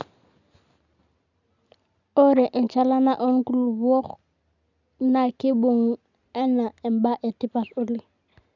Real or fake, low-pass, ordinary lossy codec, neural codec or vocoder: real; 7.2 kHz; none; none